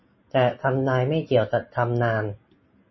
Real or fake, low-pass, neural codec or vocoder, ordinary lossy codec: real; 7.2 kHz; none; MP3, 24 kbps